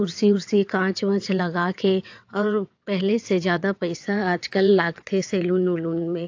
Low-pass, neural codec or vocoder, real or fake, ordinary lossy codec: 7.2 kHz; vocoder, 22.05 kHz, 80 mel bands, WaveNeXt; fake; AAC, 48 kbps